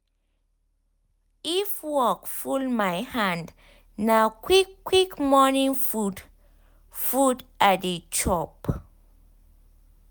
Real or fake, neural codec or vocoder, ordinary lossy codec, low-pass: real; none; none; none